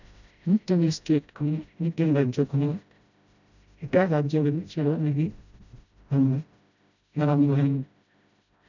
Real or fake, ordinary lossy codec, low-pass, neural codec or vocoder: fake; none; 7.2 kHz; codec, 16 kHz, 0.5 kbps, FreqCodec, smaller model